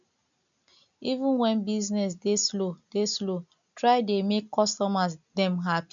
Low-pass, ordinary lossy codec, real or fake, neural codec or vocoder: 7.2 kHz; none; real; none